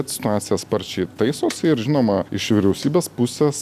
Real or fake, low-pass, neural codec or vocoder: real; 14.4 kHz; none